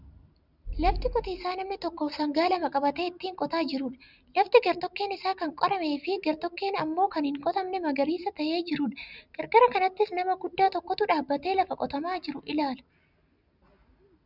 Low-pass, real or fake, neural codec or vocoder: 5.4 kHz; real; none